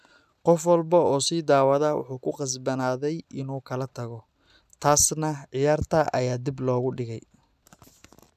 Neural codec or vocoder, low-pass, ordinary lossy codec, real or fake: none; 14.4 kHz; none; real